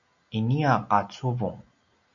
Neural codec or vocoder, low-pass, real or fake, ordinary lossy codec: none; 7.2 kHz; real; MP3, 96 kbps